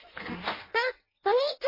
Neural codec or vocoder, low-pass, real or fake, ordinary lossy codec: codec, 16 kHz in and 24 kHz out, 0.6 kbps, FireRedTTS-2 codec; 5.4 kHz; fake; MP3, 24 kbps